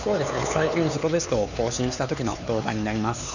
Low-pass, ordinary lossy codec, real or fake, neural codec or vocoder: 7.2 kHz; none; fake; codec, 16 kHz, 4 kbps, X-Codec, HuBERT features, trained on LibriSpeech